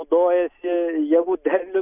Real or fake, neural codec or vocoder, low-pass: real; none; 3.6 kHz